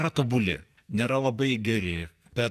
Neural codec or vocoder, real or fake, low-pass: codec, 44.1 kHz, 2.6 kbps, SNAC; fake; 14.4 kHz